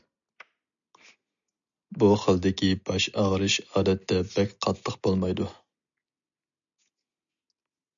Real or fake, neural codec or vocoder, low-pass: real; none; 7.2 kHz